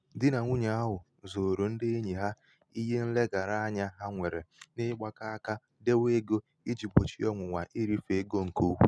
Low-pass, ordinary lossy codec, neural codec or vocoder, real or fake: none; none; none; real